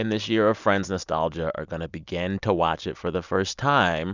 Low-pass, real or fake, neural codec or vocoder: 7.2 kHz; real; none